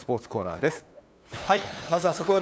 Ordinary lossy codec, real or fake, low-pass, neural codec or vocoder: none; fake; none; codec, 16 kHz, 2 kbps, FunCodec, trained on LibriTTS, 25 frames a second